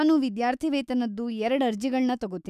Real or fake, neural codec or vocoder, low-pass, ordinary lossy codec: fake; autoencoder, 48 kHz, 128 numbers a frame, DAC-VAE, trained on Japanese speech; 14.4 kHz; none